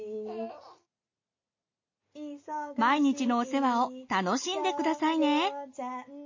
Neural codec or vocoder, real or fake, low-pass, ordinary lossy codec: none; real; 7.2 kHz; MP3, 32 kbps